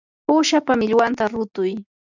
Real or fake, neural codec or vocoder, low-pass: real; none; 7.2 kHz